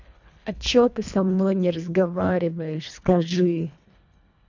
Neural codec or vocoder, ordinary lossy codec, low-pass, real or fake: codec, 24 kHz, 1.5 kbps, HILCodec; none; 7.2 kHz; fake